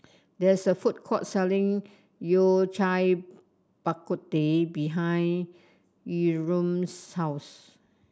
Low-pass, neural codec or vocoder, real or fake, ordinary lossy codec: none; none; real; none